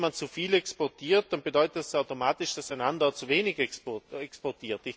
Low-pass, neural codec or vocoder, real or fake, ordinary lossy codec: none; none; real; none